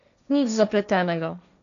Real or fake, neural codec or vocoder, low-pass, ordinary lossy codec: fake; codec, 16 kHz, 1.1 kbps, Voila-Tokenizer; 7.2 kHz; none